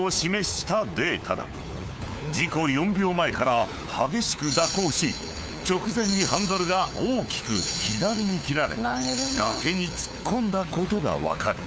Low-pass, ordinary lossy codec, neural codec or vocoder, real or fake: none; none; codec, 16 kHz, 4 kbps, FunCodec, trained on LibriTTS, 50 frames a second; fake